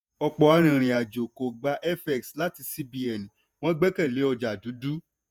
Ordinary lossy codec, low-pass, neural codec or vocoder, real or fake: none; none; vocoder, 48 kHz, 128 mel bands, Vocos; fake